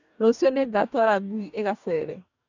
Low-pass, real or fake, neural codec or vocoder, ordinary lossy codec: 7.2 kHz; fake; codec, 44.1 kHz, 2.6 kbps, DAC; none